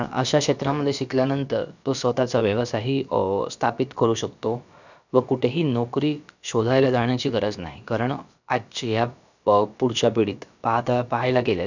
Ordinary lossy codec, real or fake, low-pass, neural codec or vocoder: none; fake; 7.2 kHz; codec, 16 kHz, about 1 kbps, DyCAST, with the encoder's durations